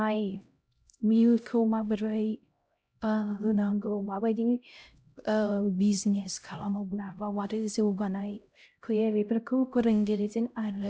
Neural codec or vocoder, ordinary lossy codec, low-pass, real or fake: codec, 16 kHz, 0.5 kbps, X-Codec, HuBERT features, trained on LibriSpeech; none; none; fake